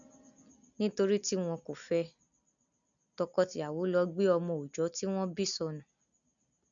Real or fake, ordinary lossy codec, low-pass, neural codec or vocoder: real; none; 7.2 kHz; none